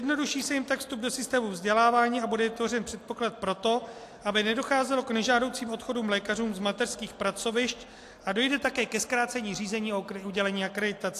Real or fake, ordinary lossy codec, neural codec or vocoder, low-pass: real; AAC, 64 kbps; none; 14.4 kHz